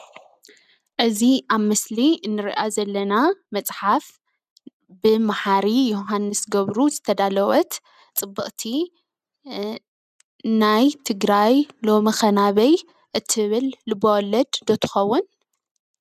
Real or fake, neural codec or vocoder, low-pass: real; none; 14.4 kHz